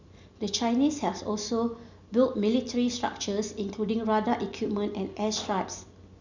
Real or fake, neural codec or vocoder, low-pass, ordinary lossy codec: real; none; 7.2 kHz; none